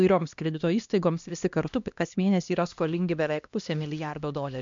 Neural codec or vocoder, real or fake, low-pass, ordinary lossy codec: codec, 16 kHz, 1 kbps, X-Codec, HuBERT features, trained on LibriSpeech; fake; 7.2 kHz; MP3, 64 kbps